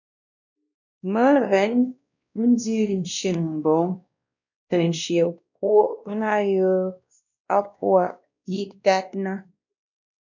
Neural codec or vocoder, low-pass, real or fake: codec, 16 kHz, 1 kbps, X-Codec, WavLM features, trained on Multilingual LibriSpeech; 7.2 kHz; fake